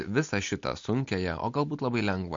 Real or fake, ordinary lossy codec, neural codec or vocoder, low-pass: real; MP3, 48 kbps; none; 7.2 kHz